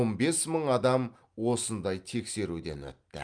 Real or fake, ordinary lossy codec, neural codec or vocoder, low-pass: real; Opus, 32 kbps; none; 9.9 kHz